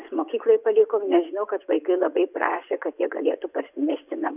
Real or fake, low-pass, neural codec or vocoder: fake; 3.6 kHz; vocoder, 24 kHz, 100 mel bands, Vocos